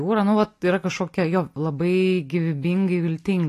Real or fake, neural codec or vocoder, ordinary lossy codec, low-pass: real; none; AAC, 48 kbps; 14.4 kHz